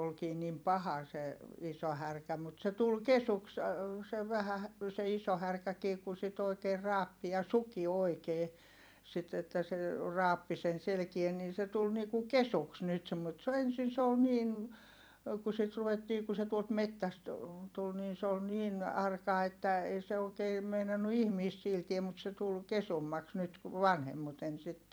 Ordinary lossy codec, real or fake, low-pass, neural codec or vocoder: none; fake; none; vocoder, 44.1 kHz, 128 mel bands every 256 samples, BigVGAN v2